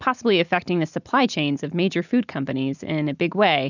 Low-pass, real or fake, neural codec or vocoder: 7.2 kHz; real; none